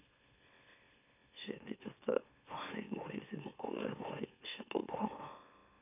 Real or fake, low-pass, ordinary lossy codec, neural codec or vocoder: fake; 3.6 kHz; none; autoencoder, 44.1 kHz, a latent of 192 numbers a frame, MeloTTS